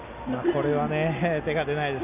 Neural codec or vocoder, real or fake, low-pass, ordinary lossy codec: none; real; 3.6 kHz; none